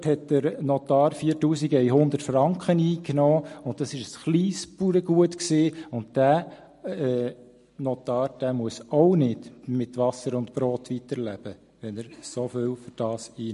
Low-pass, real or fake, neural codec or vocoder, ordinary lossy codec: 14.4 kHz; real; none; MP3, 48 kbps